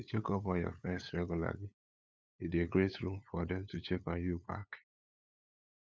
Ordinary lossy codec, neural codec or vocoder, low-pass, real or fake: none; codec, 16 kHz, 8 kbps, FunCodec, trained on LibriTTS, 25 frames a second; none; fake